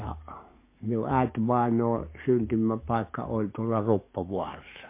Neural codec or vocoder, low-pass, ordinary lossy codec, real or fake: codec, 44.1 kHz, 7.8 kbps, DAC; 3.6 kHz; MP3, 24 kbps; fake